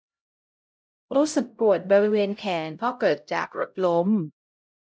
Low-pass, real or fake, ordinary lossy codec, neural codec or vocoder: none; fake; none; codec, 16 kHz, 0.5 kbps, X-Codec, HuBERT features, trained on LibriSpeech